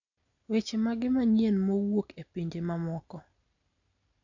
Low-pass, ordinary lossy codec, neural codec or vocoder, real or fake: 7.2 kHz; none; none; real